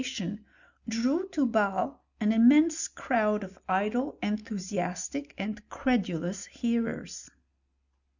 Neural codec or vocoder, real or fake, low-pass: none; real; 7.2 kHz